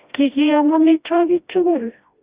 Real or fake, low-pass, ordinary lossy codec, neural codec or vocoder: fake; 3.6 kHz; Opus, 64 kbps; codec, 16 kHz, 1 kbps, FreqCodec, smaller model